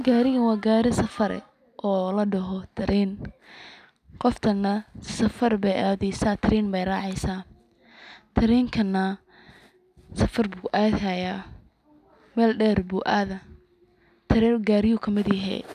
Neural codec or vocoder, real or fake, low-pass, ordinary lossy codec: none; real; 14.4 kHz; none